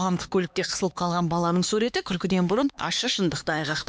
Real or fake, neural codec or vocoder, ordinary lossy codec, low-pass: fake; codec, 16 kHz, 2 kbps, X-Codec, HuBERT features, trained on LibriSpeech; none; none